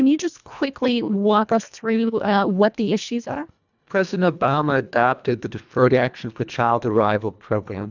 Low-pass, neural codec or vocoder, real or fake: 7.2 kHz; codec, 24 kHz, 1.5 kbps, HILCodec; fake